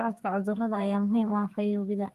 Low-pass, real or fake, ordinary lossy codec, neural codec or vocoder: 14.4 kHz; fake; Opus, 24 kbps; codec, 32 kHz, 1.9 kbps, SNAC